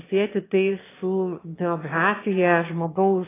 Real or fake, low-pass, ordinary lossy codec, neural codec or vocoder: fake; 3.6 kHz; AAC, 16 kbps; autoencoder, 22.05 kHz, a latent of 192 numbers a frame, VITS, trained on one speaker